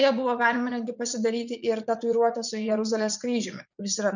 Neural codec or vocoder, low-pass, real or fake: vocoder, 44.1 kHz, 80 mel bands, Vocos; 7.2 kHz; fake